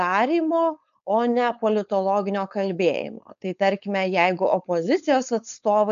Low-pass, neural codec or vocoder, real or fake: 7.2 kHz; codec, 16 kHz, 4.8 kbps, FACodec; fake